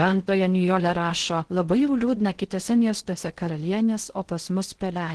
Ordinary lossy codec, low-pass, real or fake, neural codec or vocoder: Opus, 16 kbps; 10.8 kHz; fake; codec, 16 kHz in and 24 kHz out, 0.6 kbps, FocalCodec, streaming, 2048 codes